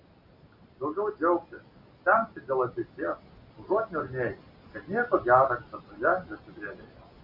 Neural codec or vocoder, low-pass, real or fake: none; 5.4 kHz; real